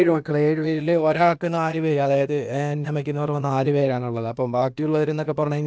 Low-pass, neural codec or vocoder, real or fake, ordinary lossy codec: none; codec, 16 kHz, 0.8 kbps, ZipCodec; fake; none